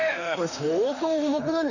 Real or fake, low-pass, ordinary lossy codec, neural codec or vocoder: fake; 7.2 kHz; none; autoencoder, 48 kHz, 32 numbers a frame, DAC-VAE, trained on Japanese speech